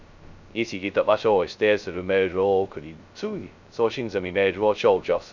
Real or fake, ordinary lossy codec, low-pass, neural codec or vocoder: fake; none; 7.2 kHz; codec, 16 kHz, 0.2 kbps, FocalCodec